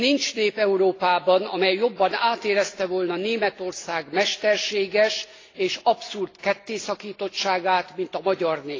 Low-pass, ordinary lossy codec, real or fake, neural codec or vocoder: 7.2 kHz; AAC, 32 kbps; real; none